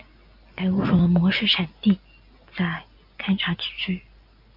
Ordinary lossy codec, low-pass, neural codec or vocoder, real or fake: MP3, 48 kbps; 5.4 kHz; none; real